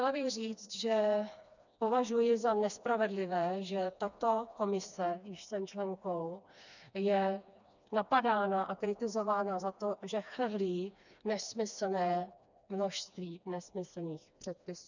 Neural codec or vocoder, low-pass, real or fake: codec, 16 kHz, 2 kbps, FreqCodec, smaller model; 7.2 kHz; fake